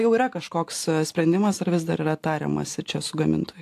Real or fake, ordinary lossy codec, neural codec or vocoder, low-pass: real; AAC, 64 kbps; none; 14.4 kHz